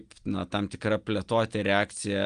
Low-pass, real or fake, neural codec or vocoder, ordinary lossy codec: 9.9 kHz; real; none; Opus, 32 kbps